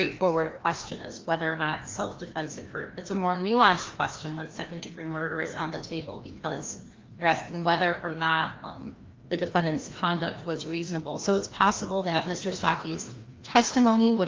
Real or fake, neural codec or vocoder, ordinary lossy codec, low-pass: fake; codec, 16 kHz, 1 kbps, FreqCodec, larger model; Opus, 32 kbps; 7.2 kHz